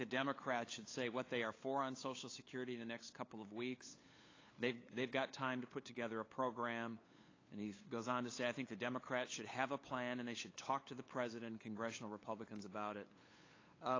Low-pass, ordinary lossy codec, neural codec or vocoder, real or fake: 7.2 kHz; AAC, 32 kbps; codec, 16 kHz, 8 kbps, FunCodec, trained on LibriTTS, 25 frames a second; fake